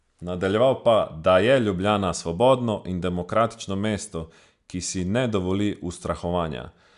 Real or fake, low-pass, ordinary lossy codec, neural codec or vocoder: real; 10.8 kHz; MP3, 96 kbps; none